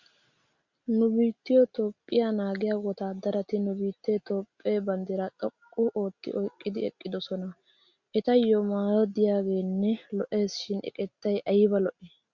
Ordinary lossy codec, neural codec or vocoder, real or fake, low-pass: Opus, 64 kbps; none; real; 7.2 kHz